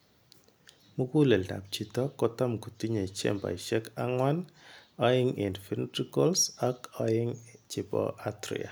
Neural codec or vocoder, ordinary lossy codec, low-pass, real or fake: none; none; none; real